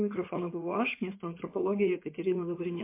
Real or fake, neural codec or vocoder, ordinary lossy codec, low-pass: fake; codec, 16 kHz in and 24 kHz out, 2.2 kbps, FireRedTTS-2 codec; MP3, 24 kbps; 3.6 kHz